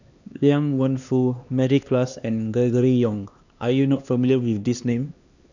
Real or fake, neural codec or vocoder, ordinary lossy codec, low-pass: fake; codec, 16 kHz, 2 kbps, X-Codec, HuBERT features, trained on LibriSpeech; none; 7.2 kHz